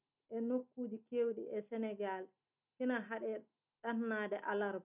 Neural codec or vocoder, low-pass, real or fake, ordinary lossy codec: none; 3.6 kHz; real; none